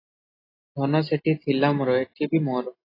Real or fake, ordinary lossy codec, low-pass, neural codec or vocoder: real; AAC, 24 kbps; 5.4 kHz; none